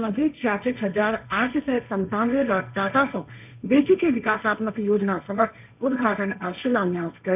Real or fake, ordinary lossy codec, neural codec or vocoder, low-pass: fake; none; codec, 16 kHz, 1.1 kbps, Voila-Tokenizer; 3.6 kHz